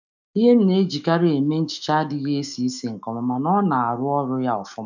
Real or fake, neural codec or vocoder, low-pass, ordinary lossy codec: fake; autoencoder, 48 kHz, 128 numbers a frame, DAC-VAE, trained on Japanese speech; 7.2 kHz; none